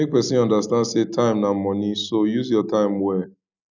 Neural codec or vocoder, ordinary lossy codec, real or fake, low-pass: none; none; real; 7.2 kHz